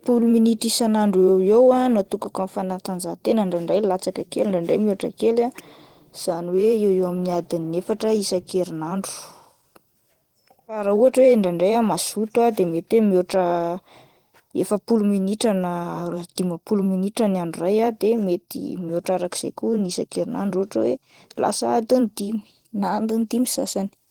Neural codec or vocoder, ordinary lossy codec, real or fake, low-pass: vocoder, 44.1 kHz, 128 mel bands every 512 samples, BigVGAN v2; Opus, 16 kbps; fake; 19.8 kHz